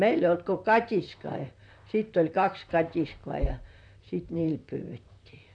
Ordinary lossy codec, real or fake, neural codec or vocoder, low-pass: none; fake; vocoder, 44.1 kHz, 128 mel bands, Pupu-Vocoder; 9.9 kHz